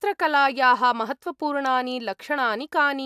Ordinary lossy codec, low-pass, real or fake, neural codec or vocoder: AAC, 96 kbps; 14.4 kHz; real; none